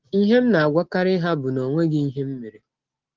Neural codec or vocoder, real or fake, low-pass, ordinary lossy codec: none; real; 7.2 kHz; Opus, 16 kbps